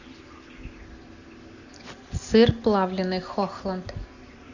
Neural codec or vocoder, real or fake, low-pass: none; real; 7.2 kHz